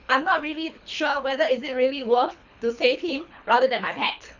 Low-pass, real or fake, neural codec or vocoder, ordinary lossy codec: 7.2 kHz; fake; codec, 24 kHz, 3 kbps, HILCodec; none